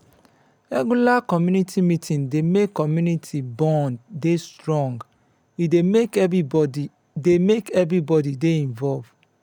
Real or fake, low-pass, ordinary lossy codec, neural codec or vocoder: real; 19.8 kHz; none; none